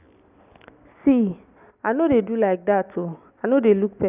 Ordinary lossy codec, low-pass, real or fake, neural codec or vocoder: none; 3.6 kHz; real; none